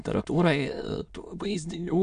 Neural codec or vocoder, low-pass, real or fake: autoencoder, 22.05 kHz, a latent of 192 numbers a frame, VITS, trained on many speakers; 9.9 kHz; fake